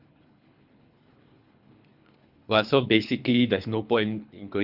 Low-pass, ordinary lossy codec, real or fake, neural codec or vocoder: 5.4 kHz; none; fake; codec, 24 kHz, 3 kbps, HILCodec